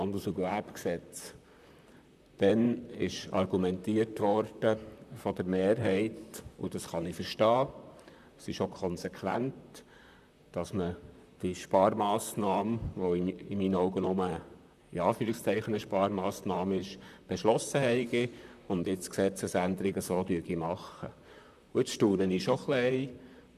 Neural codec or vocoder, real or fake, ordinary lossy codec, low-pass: vocoder, 44.1 kHz, 128 mel bands, Pupu-Vocoder; fake; none; 14.4 kHz